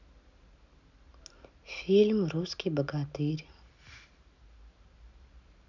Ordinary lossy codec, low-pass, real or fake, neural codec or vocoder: none; 7.2 kHz; real; none